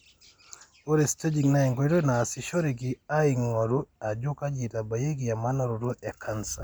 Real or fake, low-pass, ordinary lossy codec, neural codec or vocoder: real; none; none; none